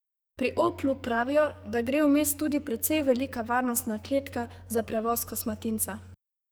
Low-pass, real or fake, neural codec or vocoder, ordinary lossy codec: none; fake; codec, 44.1 kHz, 2.6 kbps, SNAC; none